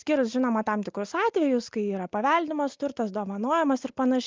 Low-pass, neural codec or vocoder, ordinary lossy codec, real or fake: 7.2 kHz; none; Opus, 32 kbps; real